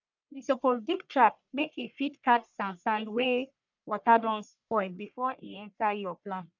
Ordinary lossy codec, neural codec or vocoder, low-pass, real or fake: none; codec, 44.1 kHz, 1.7 kbps, Pupu-Codec; 7.2 kHz; fake